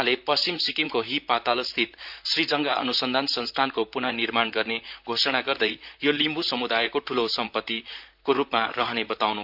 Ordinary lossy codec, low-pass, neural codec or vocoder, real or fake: MP3, 48 kbps; 5.4 kHz; vocoder, 44.1 kHz, 128 mel bands, Pupu-Vocoder; fake